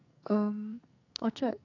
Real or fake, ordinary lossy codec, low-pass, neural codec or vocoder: fake; none; 7.2 kHz; codec, 44.1 kHz, 2.6 kbps, SNAC